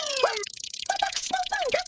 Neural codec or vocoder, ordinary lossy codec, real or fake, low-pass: codec, 16 kHz, 16 kbps, FreqCodec, smaller model; none; fake; none